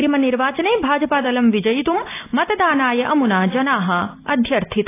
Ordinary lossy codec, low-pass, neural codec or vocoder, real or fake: AAC, 24 kbps; 3.6 kHz; none; real